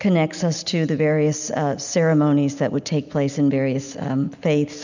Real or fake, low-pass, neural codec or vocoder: real; 7.2 kHz; none